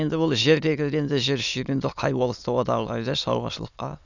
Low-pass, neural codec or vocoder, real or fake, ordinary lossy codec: 7.2 kHz; autoencoder, 22.05 kHz, a latent of 192 numbers a frame, VITS, trained on many speakers; fake; none